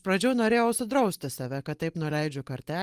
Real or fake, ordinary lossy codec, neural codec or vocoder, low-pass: real; Opus, 24 kbps; none; 14.4 kHz